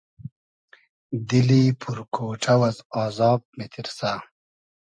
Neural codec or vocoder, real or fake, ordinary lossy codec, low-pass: none; real; MP3, 64 kbps; 9.9 kHz